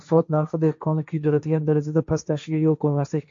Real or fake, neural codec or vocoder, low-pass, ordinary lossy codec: fake; codec, 16 kHz, 1.1 kbps, Voila-Tokenizer; 7.2 kHz; MP3, 48 kbps